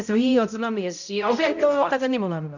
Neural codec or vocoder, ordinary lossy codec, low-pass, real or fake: codec, 16 kHz, 0.5 kbps, X-Codec, HuBERT features, trained on balanced general audio; none; 7.2 kHz; fake